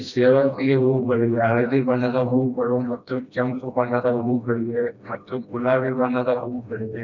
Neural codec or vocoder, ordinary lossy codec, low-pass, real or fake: codec, 16 kHz, 1 kbps, FreqCodec, smaller model; none; 7.2 kHz; fake